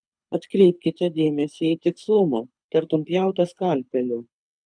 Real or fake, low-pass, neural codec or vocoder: fake; 9.9 kHz; codec, 24 kHz, 6 kbps, HILCodec